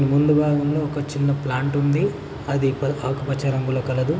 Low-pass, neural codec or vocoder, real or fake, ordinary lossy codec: none; none; real; none